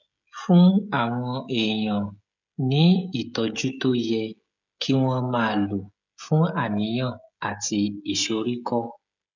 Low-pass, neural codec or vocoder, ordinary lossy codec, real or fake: 7.2 kHz; codec, 16 kHz, 16 kbps, FreqCodec, smaller model; none; fake